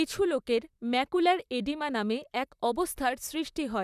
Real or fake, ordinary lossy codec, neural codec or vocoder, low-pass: fake; none; vocoder, 44.1 kHz, 128 mel bands, Pupu-Vocoder; 14.4 kHz